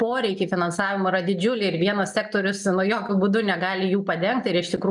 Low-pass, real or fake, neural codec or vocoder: 10.8 kHz; real; none